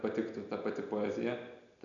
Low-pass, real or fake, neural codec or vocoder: 7.2 kHz; real; none